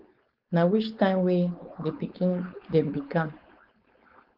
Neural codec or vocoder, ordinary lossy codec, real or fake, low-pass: codec, 16 kHz, 4.8 kbps, FACodec; Opus, 32 kbps; fake; 5.4 kHz